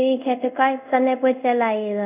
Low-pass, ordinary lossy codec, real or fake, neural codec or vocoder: 3.6 kHz; none; fake; codec, 24 kHz, 0.5 kbps, DualCodec